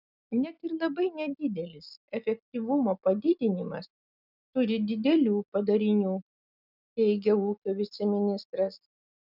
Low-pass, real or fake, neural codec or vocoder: 5.4 kHz; real; none